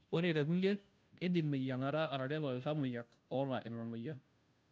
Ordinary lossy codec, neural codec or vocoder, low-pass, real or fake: none; codec, 16 kHz, 0.5 kbps, FunCodec, trained on Chinese and English, 25 frames a second; none; fake